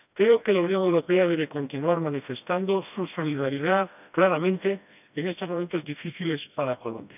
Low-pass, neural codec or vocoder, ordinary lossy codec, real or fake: 3.6 kHz; codec, 16 kHz, 1 kbps, FreqCodec, smaller model; none; fake